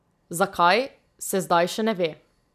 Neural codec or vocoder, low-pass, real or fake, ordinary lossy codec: none; 14.4 kHz; real; none